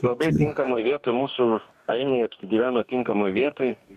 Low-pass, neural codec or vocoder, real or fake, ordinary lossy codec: 14.4 kHz; codec, 44.1 kHz, 2.6 kbps, DAC; fake; AAC, 96 kbps